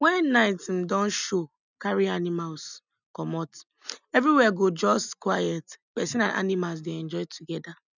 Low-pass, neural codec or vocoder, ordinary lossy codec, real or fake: 7.2 kHz; none; none; real